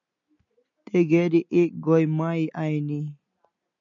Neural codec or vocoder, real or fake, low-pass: none; real; 7.2 kHz